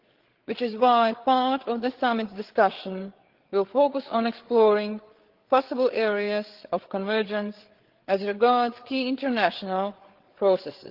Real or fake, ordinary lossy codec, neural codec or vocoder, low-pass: fake; Opus, 16 kbps; codec, 16 kHz, 8 kbps, FreqCodec, larger model; 5.4 kHz